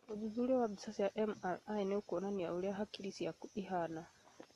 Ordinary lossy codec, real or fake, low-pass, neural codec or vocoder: AAC, 32 kbps; real; 19.8 kHz; none